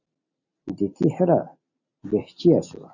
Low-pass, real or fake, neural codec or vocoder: 7.2 kHz; real; none